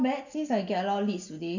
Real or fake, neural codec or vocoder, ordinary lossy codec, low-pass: real; none; none; 7.2 kHz